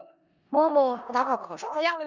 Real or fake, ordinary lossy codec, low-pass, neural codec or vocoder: fake; Opus, 64 kbps; 7.2 kHz; codec, 16 kHz in and 24 kHz out, 0.4 kbps, LongCat-Audio-Codec, four codebook decoder